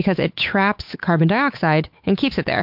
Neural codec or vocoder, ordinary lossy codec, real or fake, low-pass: codec, 16 kHz, 8 kbps, FunCodec, trained on Chinese and English, 25 frames a second; MP3, 48 kbps; fake; 5.4 kHz